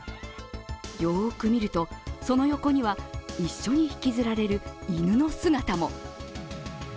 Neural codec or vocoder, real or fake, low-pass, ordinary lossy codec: none; real; none; none